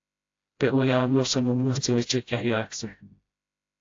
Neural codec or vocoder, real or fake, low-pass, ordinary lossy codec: codec, 16 kHz, 0.5 kbps, FreqCodec, smaller model; fake; 7.2 kHz; AAC, 48 kbps